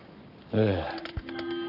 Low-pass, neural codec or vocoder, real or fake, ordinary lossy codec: 5.4 kHz; codec, 44.1 kHz, 7.8 kbps, Pupu-Codec; fake; none